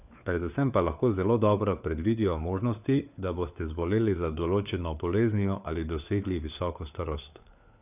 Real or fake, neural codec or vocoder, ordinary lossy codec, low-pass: fake; codec, 16 kHz, 4 kbps, FunCodec, trained on LibriTTS, 50 frames a second; none; 3.6 kHz